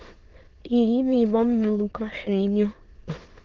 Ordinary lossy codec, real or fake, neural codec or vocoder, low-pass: Opus, 16 kbps; fake; autoencoder, 22.05 kHz, a latent of 192 numbers a frame, VITS, trained on many speakers; 7.2 kHz